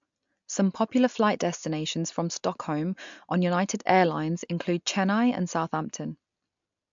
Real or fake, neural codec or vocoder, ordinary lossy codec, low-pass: real; none; MP3, 64 kbps; 7.2 kHz